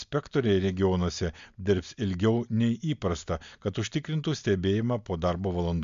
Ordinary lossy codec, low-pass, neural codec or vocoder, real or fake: MP3, 64 kbps; 7.2 kHz; none; real